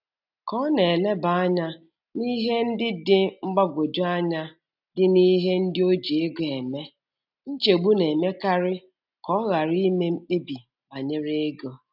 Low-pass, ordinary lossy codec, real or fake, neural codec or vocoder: 5.4 kHz; none; real; none